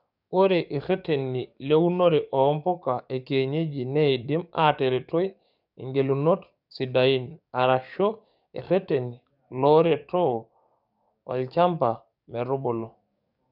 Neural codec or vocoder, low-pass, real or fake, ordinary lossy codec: codec, 44.1 kHz, 7.8 kbps, DAC; 5.4 kHz; fake; none